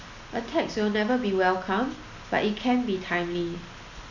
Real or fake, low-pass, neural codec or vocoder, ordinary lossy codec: real; 7.2 kHz; none; none